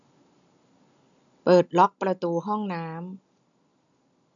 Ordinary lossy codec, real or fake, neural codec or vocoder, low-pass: none; real; none; 7.2 kHz